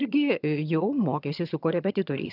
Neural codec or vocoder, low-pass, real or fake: vocoder, 22.05 kHz, 80 mel bands, HiFi-GAN; 5.4 kHz; fake